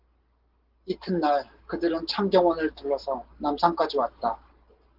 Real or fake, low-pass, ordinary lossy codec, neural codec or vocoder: real; 5.4 kHz; Opus, 16 kbps; none